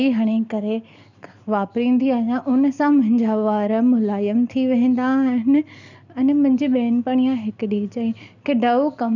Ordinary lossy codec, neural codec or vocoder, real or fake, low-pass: none; none; real; 7.2 kHz